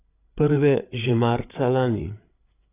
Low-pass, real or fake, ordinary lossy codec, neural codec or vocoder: 3.6 kHz; fake; AAC, 24 kbps; codec, 16 kHz, 8 kbps, FreqCodec, larger model